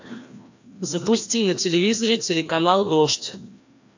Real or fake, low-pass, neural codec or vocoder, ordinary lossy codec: fake; 7.2 kHz; codec, 16 kHz, 1 kbps, FreqCodec, larger model; AAC, 48 kbps